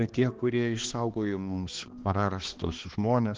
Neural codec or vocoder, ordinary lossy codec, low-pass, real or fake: codec, 16 kHz, 2 kbps, X-Codec, HuBERT features, trained on balanced general audio; Opus, 16 kbps; 7.2 kHz; fake